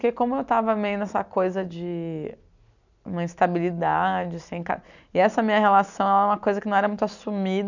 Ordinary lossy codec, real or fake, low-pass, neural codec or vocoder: none; real; 7.2 kHz; none